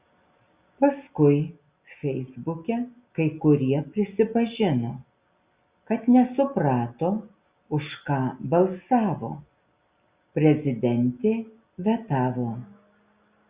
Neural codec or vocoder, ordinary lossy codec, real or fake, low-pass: none; Opus, 64 kbps; real; 3.6 kHz